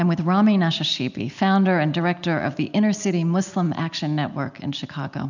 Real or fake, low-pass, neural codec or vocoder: real; 7.2 kHz; none